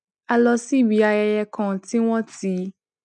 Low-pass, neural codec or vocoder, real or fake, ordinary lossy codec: 9.9 kHz; none; real; none